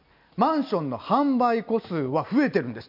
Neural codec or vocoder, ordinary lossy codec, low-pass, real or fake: none; none; 5.4 kHz; real